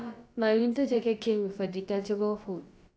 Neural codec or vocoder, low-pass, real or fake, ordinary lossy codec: codec, 16 kHz, about 1 kbps, DyCAST, with the encoder's durations; none; fake; none